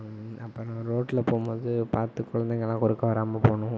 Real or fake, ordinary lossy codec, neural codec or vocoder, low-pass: real; none; none; none